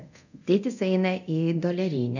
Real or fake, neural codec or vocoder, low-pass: fake; codec, 24 kHz, 0.9 kbps, DualCodec; 7.2 kHz